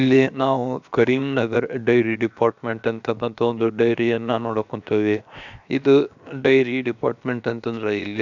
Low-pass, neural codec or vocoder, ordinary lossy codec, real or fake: 7.2 kHz; codec, 16 kHz, 0.7 kbps, FocalCodec; none; fake